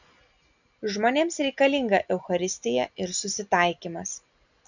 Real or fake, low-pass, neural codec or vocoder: real; 7.2 kHz; none